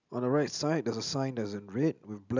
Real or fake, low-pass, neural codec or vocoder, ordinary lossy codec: real; 7.2 kHz; none; none